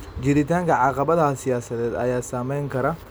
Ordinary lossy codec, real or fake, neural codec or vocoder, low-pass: none; real; none; none